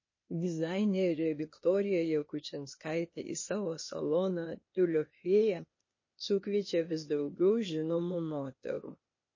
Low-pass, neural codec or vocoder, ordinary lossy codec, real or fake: 7.2 kHz; codec, 16 kHz, 0.8 kbps, ZipCodec; MP3, 32 kbps; fake